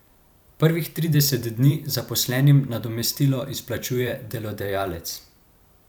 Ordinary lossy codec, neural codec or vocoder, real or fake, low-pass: none; vocoder, 44.1 kHz, 128 mel bands every 512 samples, BigVGAN v2; fake; none